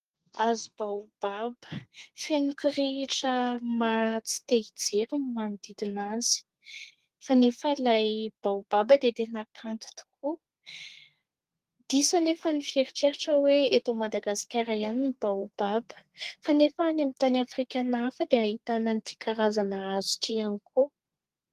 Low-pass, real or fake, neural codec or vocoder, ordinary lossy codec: 14.4 kHz; fake; codec, 32 kHz, 1.9 kbps, SNAC; Opus, 24 kbps